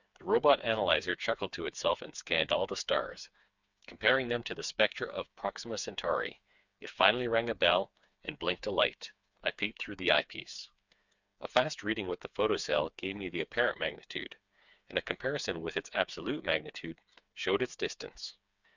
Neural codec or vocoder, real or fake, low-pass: codec, 16 kHz, 4 kbps, FreqCodec, smaller model; fake; 7.2 kHz